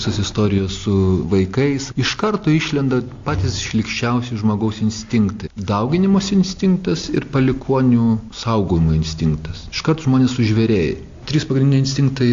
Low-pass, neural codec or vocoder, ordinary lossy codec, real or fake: 7.2 kHz; none; AAC, 48 kbps; real